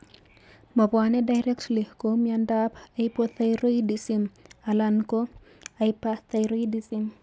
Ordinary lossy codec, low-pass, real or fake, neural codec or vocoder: none; none; real; none